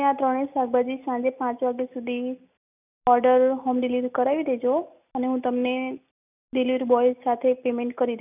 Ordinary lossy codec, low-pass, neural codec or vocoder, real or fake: none; 3.6 kHz; none; real